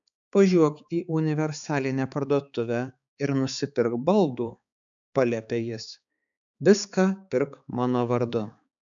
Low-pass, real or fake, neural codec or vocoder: 7.2 kHz; fake; codec, 16 kHz, 4 kbps, X-Codec, HuBERT features, trained on balanced general audio